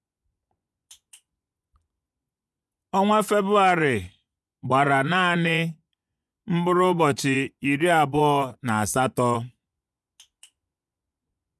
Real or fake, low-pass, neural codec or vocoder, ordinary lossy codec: fake; none; vocoder, 24 kHz, 100 mel bands, Vocos; none